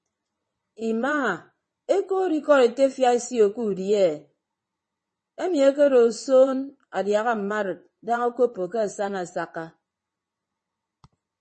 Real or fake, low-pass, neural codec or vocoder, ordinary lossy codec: fake; 9.9 kHz; vocoder, 22.05 kHz, 80 mel bands, WaveNeXt; MP3, 32 kbps